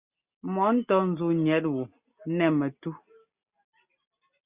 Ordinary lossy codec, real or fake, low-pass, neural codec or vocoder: Opus, 64 kbps; real; 3.6 kHz; none